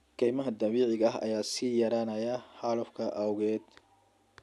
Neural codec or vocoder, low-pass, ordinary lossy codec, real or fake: none; none; none; real